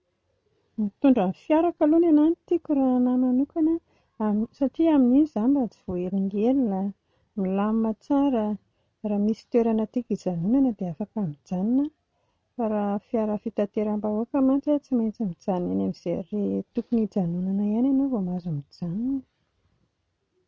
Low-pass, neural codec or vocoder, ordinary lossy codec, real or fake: none; none; none; real